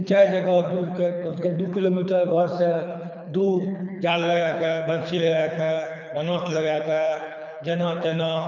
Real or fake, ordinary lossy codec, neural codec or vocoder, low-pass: fake; none; codec, 24 kHz, 3 kbps, HILCodec; 7.2 kHz